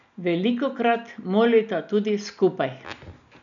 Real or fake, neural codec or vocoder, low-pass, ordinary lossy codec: real; none; 7.2 kHz; none